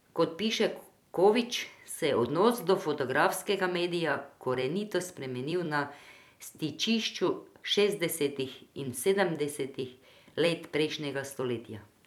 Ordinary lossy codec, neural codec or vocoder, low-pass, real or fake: none; vocoder, 44.1 kHz, 128 mel bands every 256 samples, BigVGAN v2; 19.8 kHz; fake